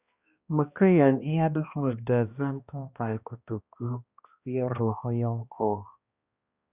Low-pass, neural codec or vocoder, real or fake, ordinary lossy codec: 3.6 kHz; codec, 16 kHz, 1 kbps, X-Codec, HuBERT features, trained on balanced general audio; fake; Opus, 64 kbps